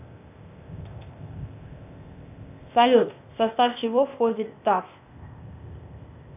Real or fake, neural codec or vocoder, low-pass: fake; codec, 16 kHz, 0.8 kbps, ZipCodec; 3.6 kHz